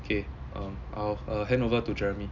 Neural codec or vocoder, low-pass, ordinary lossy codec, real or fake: none; 7.2 kHz; none; real